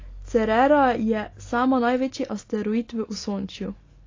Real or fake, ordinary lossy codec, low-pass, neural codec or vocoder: real; AAC, 32 kbps; 7.2 kHz; none